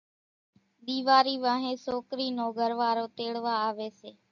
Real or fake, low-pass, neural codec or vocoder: real; 7.2 kHz; none